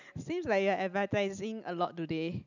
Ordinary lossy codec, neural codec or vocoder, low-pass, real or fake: none; none; 7.2 kHz; real